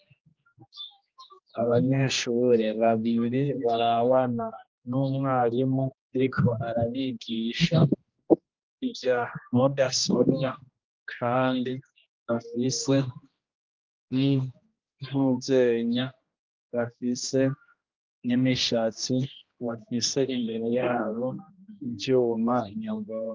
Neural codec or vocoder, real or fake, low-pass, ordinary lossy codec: codec, 16 kHz, 1 kbps, X-Codec, HuBERT features, trained on general audio; fake; 7.2 kHz; Opus, 32 kbps